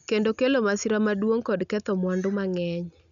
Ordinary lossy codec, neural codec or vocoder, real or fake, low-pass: none; none; real; 7.2 kHz